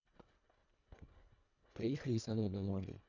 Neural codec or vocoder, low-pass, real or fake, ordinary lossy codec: codec, 24 kHz, 1.5 kbps, HILCodec; 7.2 kHz; fake; none